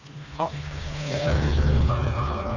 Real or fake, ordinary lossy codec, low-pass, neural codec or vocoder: fake; none; 7.2 kHz; codec, 16 kHz, 2 kbps, FreqCodec, smaller model